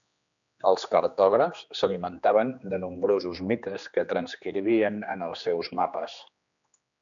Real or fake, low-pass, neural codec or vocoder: fake; 7.2 kHz; codec, 16 kHz, 2 kbps, X-Codec, HuBERT features, trained on general audio